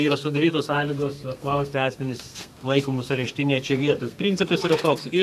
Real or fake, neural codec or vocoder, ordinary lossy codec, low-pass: fake; codec, 32 kHz, 1.9 kbps, SNAC; MP3, 96 kbps; 14.4 kHz